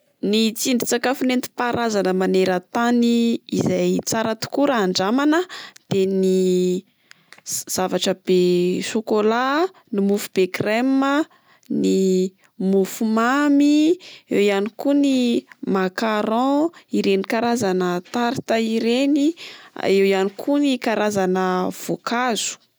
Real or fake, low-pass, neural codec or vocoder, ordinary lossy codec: real; none; none; none